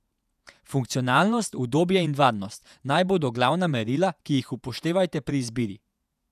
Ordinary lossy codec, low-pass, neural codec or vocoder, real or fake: none; 14.4 kHz; vocoder, 48 kHz, 128 mel bands, Vocos; fake